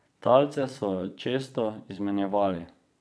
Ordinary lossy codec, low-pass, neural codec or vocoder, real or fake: none; none; vocoder, 22.05 kHz, 80 mel bands, WaveNeXt; fake